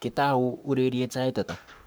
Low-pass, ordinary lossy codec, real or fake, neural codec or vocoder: none; none; fake; codec, 44.1 kHz, 7.8 kbps, Pupu-Codec